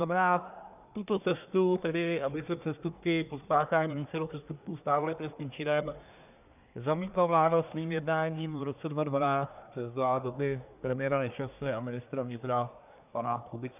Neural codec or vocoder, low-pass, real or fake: codec, 24 kHz, 1 kbps, SNAC; 3.6 kHz; fake